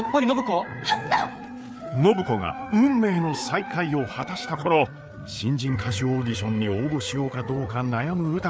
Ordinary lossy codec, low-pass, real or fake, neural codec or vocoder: none; none; fake; codec, 16 kHz, 4 kbps, FreqCodec, larger model